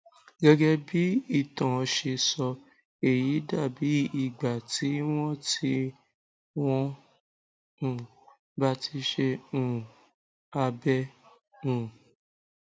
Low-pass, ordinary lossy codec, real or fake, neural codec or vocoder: none; none; real; none